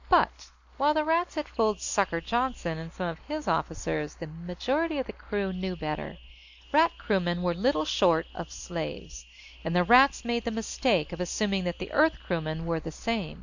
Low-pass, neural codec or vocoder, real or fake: 7.2 kHz; none; real